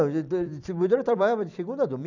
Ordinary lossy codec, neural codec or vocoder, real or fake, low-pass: none; vocoder, 44.1 kHz, 128 mel bands every 256 samples, BigVGAN v2; fake; 7.2 kHz